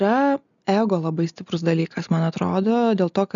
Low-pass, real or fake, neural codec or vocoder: 7.2 kHz; real; none